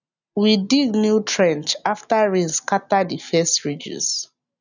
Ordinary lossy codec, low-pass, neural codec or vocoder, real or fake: none; 7.2 kHz; none; real